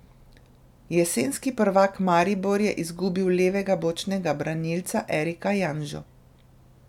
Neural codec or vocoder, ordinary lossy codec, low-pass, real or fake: none; none; 19.8 kHz; real